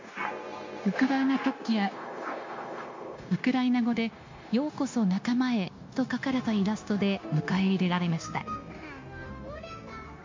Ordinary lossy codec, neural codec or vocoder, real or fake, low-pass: MP3, 48 kbps; codec, 16 kHz, 0.9 kbps, LongCat-Audio-Codec; fake; 7.2 kHz